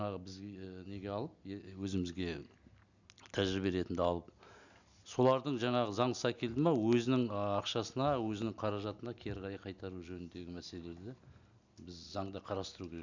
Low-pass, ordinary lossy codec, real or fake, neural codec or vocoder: 7.2 kHz; none; real; none